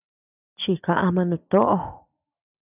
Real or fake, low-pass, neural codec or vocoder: real; 3.6 kHz; none